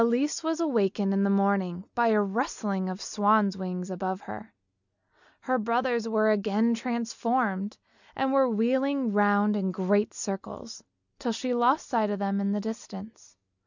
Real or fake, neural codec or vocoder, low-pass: real; none; 7.2 kHz